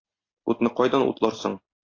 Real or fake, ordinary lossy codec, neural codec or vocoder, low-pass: real; AAC, 32 kbps; none; 7.2 kHz